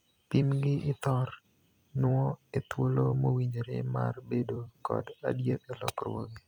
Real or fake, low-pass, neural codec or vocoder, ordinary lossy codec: fake; 19.8 kHz; vocoder, 44.1 kHz, 128 mel bands every 256 samples, BigVGAN v2; none